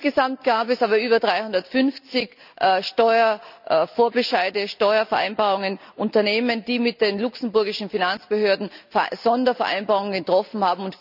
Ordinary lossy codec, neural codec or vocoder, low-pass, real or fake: none; none; 5.4 kHz; real